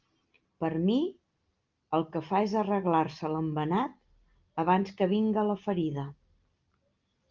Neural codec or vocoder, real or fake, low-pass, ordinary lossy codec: none; real; 7.2 kHz; Opus, 24 kbps